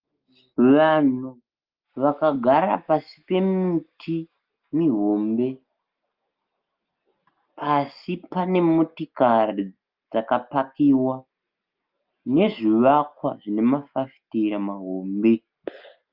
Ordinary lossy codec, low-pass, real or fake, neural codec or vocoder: Opus, 24 kbps; 5.4 kHz; real; none